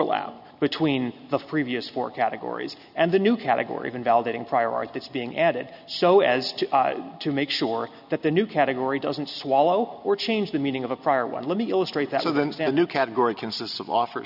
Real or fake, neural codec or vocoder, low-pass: real; none; 5.4 kHz